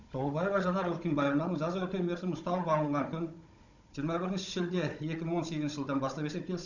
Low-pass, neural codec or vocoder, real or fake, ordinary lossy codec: 7.2 kHz; codec, 16 kHz, 16 kbps, FunCodec, trained on Chinese and English, 50 frames a second; fake; none